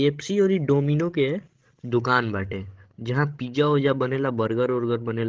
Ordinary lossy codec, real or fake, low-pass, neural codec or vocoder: Opus, 16 kbps; fake; 7.2 kHz; codec, 16 kHz, 16 kbps, FreqCodec, larger model